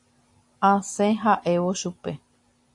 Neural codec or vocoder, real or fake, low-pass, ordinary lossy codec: none; real; 10.8 kHz; AAC, 64 kbps